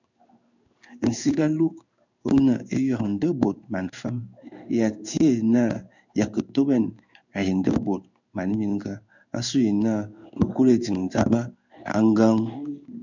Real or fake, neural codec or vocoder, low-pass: fake; codec, 16 kHz in and 24 kHz out, 1 kbps, XY-Tokenizer; 7.2 kHz